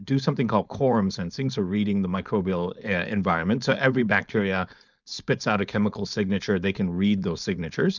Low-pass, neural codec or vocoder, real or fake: 7.2 kHz; codec, 16 kHz, 4.8 kbps, FACodec; fake